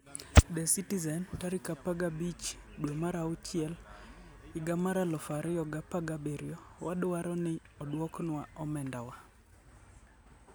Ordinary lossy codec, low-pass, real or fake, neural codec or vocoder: none; none; real; none